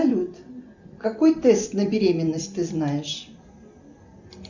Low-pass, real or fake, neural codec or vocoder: 7.2 kHz; real; none